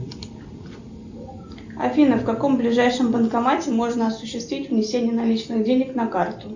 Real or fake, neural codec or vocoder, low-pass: real; none; 7.2 kHz